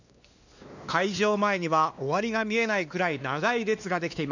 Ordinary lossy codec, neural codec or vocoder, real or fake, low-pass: none; codec, 16 kHz, 2 kbps, X-Codec, WavLM features, trained on Multilingual LibriSpeech; fake; 7.2 kHz